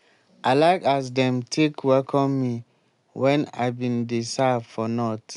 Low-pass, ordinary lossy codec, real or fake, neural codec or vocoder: 10.8 kHz; none; real; none